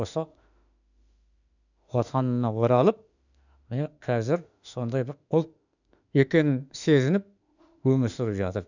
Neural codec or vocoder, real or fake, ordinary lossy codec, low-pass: autoencoder, 48 kHz, 32 numbers a frame, DAC-VAE, trained on Japanese speech; fake; none; 7.2 kHz